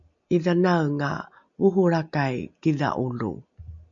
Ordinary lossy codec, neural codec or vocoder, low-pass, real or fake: MP3, 64 kbps; none; 7.2 kHz; real